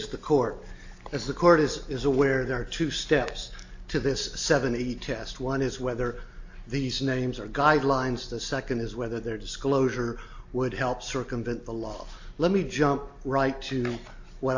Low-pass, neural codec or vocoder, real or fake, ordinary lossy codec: 7.2 kHz; none; real; AAC, 48 kbps